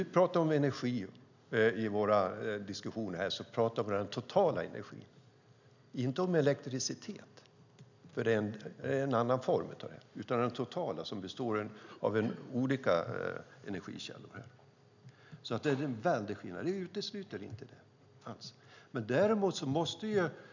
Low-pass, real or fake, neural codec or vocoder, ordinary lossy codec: 7.2 kHz; real; none; none